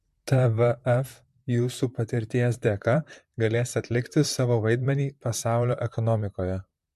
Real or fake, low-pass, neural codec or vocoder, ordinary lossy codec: fake; 14.4 kHz; vocoder, 44.1 kHz, 128 mel bands, Pupu-Vocoder; MP3, 64 kbps